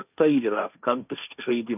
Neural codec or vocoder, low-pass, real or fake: codec, 16 kHz, 1.1 kbps, Voila-Tokenizer; 3.6 kHz; fake